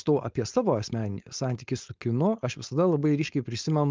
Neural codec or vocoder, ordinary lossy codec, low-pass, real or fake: codec, 16 kHz, 4.8 kbps, FACodec; Opus, 32 kbps; 7.2 kHz; fake